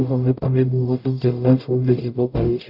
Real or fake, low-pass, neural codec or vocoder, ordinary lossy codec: fake; 5.4 kHz; codec, 44.1 kHz, 0.9 kbps, DAC; none